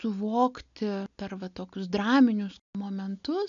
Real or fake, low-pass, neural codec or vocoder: real; 7.2 kHz; none